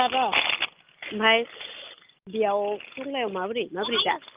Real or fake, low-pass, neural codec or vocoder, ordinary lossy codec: real; 3.6 kHz; none; Opus, 32 kbps